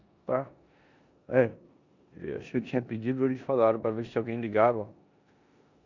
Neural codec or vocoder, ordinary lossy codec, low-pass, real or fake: codec, 16 kHz in and 24 kHz out, 0.9 kbps, LongCat-Audio-Codec, four codebook decoder; none; 7.2 kHz; fake